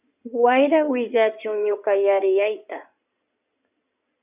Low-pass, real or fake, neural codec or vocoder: 3.6 kHz; fake; codec, 16 kHz in and 24 kHz out, 2.2 kbps, FireRedTTS-2 codec